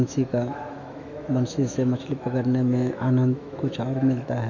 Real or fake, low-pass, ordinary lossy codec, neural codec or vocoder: fake; 7.2 kHz; none; autoencoder, 48 kHz, 128 numbers a frame, DAC-VAE, trained on Japanese speech